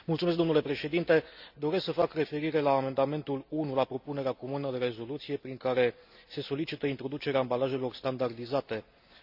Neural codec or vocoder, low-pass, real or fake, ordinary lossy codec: none; 5.4 kHz; real; none